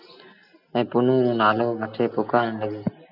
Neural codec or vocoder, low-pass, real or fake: none; 5.4 kHz; real